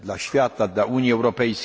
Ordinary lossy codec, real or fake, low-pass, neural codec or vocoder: none; real; none; none